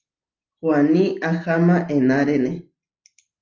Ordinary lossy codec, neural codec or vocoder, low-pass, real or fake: Opus, 24 kbps; none; 7.2 kHz; real